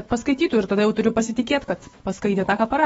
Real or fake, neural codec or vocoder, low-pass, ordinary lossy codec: fake; autoencoder, 48 kHz, 128 numbers a frame, DAC-VAE, trained on Japanese speech; 19.8 kHz; AAC, 24 kbps